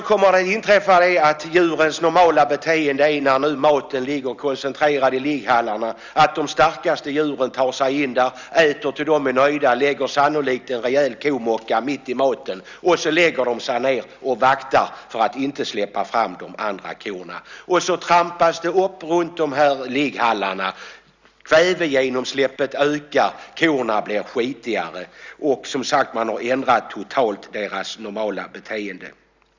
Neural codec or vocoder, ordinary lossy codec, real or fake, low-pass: none; Opus, 64 kbps; real; 7.2 kHz